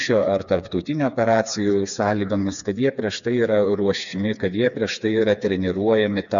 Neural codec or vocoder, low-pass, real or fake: codec, 16 kHz, 4 kbps, FreqCodec, smaller model; 7.2 kHz; fake